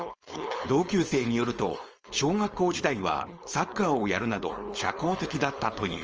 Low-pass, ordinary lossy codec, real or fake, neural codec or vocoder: 7.2 kHz; Opus, 24 kbps; fake; codec, 16 kHz, 4.8 kbps, FACodec